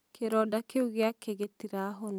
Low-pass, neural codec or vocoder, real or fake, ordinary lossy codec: none; none; real; none